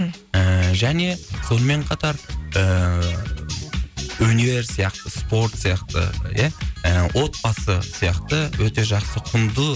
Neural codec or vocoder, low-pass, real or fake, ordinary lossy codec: none; none; real; none